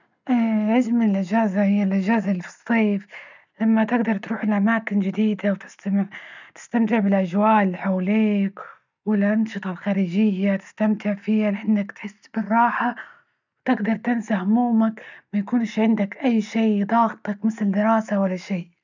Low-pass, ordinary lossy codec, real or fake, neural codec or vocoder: 7.2 kHz; none; real; none